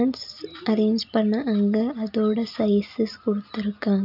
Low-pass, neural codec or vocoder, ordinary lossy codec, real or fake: 5.4 kHz; none; none; real